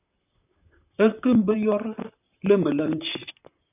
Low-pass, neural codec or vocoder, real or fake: 3.6 kHz; vocoder, 44.1 kHz, 128 mel bands, Pupu-Vocoder; fake